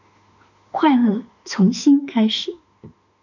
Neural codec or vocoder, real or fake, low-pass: autoencoder, 48 kHz, 32 numbers a frame, DAC-VAE, trained on Japanese speech; fake; 7.2 kHz